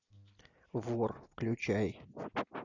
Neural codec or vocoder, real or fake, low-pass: none; real; 7.2 kHz